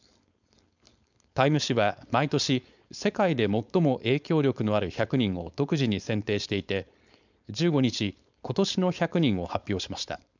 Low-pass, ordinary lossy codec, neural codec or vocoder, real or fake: 7.2 kHz; none; codec, 16 kHz, 4.8 kbps, FACodec; fake